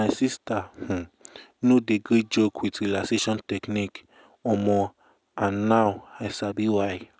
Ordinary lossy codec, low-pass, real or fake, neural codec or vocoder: none; none; real; none